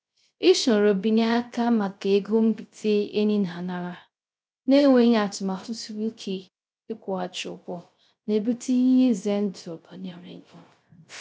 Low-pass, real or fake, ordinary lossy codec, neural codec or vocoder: none; fake; none; codec, 16 kHz, 0.3 kbps, FocalCodec